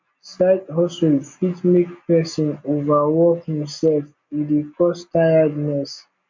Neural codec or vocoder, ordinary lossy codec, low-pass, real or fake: none; MP3, 64 kbps; 7.2 kHz; real